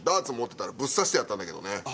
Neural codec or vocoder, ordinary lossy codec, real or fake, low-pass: none; none; real; none